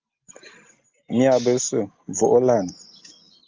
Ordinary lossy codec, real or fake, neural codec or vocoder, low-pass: Opus, 32 kbps; real; none; 7.2 kHz